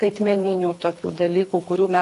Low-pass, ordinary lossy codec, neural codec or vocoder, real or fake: 10.8 kHz; AAC, 48 kbps; codec, 24 kHz, 3 kbps, HILCodec; fake